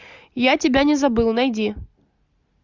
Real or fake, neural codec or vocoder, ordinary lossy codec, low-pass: real; none; Opus, 64 kbps; 7.2 kHz